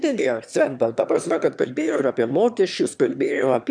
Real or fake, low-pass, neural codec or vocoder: fake; 9.9 kHz; autoencoder, 22.05 kHz, a latent of 192 numbers a frame, VITS, trained on one speaker